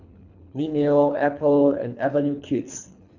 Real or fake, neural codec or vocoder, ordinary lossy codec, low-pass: fake; codec, 24 kHz, 3 kbps, HILCodec; none; 7.2 kHz